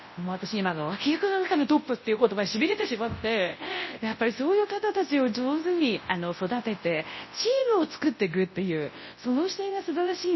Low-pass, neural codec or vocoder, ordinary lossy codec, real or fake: 7.2 kHz; codec, 24 kHz, 0.9 kbps, WavTokenizer, large speech release; MP3, 24 kbps; fake